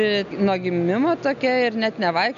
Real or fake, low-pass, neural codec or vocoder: real; 7.2 kHz; none